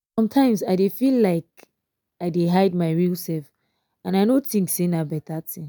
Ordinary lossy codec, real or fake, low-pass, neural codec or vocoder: none; real; none; none